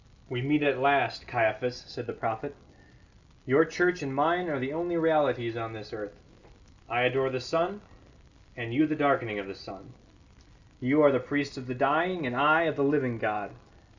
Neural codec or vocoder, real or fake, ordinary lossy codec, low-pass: none; real; Opus, 64 kbps; 7.2 kHz